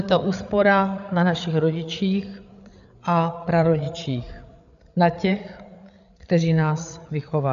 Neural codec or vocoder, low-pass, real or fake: codec, 16 kHz, 8 kbps, FreqCodec, larger model; 7.2 kHz; fake